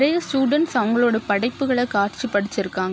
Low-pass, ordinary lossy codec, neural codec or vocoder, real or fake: none; none; none; real